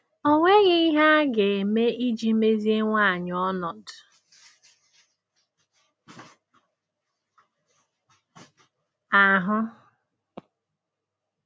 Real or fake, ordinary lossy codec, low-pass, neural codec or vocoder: real; none; none; none